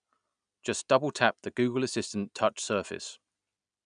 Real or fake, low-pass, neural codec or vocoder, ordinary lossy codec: real; 9.9 kHz; none; none